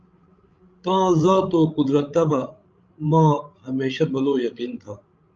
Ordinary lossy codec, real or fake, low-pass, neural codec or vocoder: Opus, 24 kbps; fake; 7.2 kHz; codec, 16 kHz, 16 kbps, FreqCodec, larger model